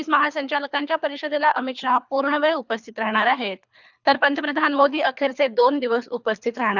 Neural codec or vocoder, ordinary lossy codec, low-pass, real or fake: codec, 24 kHz, 3 kbps, HILCodec; none; 7.2 kHz; fake